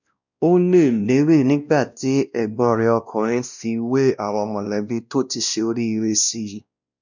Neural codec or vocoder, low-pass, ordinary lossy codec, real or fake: codec, 16 kHz, 1 kbps, X-Codec, WavLM features, trained on Multilingual LibriSpeech; 7.2 kHz; none; fake